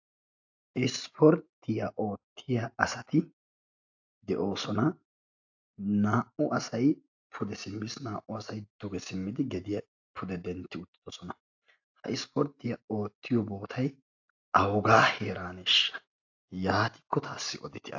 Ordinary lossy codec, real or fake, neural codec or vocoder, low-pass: AAC, 48 kbps; real; none; 7.2 kHz